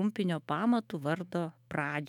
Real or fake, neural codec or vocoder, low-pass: fake; autoencoder, 48 kHz, 128 numbers a frame, DAC-VAE, trained on Japanese speech; 19.8 kHz